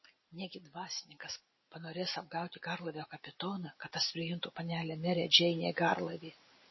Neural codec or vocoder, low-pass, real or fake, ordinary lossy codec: none; 7.2 kHz; real; MP3, 24 kbps